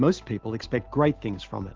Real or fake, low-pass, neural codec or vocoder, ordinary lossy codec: real; 7.2 kHz; none; Opus, 16 kbps